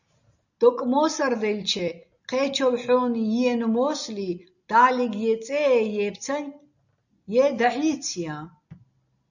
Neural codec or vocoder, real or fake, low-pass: none; real; 7.2 kHz